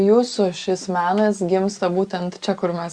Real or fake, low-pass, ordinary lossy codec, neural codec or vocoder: real; 9.9 kHz; Opus, 64 kbps; none